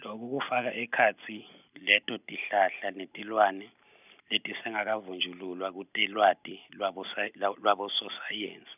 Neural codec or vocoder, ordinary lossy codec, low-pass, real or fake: none; none; 3.6 kHz; real